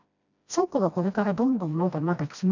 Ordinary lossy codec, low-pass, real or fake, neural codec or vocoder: none; 7.2 kHz; fake; codec, 16 kHz, 1 kbps, FreqCodec, smaller model